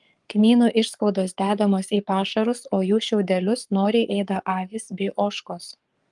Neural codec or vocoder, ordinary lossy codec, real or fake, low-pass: codec, 44.1 kHz, 7.8 kbps, Pupu-Codec; Opus, 32 kbps; fake; 10.8 kHz